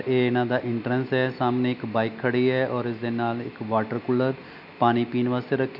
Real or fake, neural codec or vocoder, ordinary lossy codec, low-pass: real; none; none; 5.4 kHz